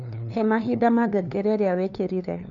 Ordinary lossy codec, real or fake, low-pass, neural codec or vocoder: none; fake; 7.2 kHz; codec, 16 kHz, 2 kbps, FunCodec, trained on LibriTTS, 25 frames a second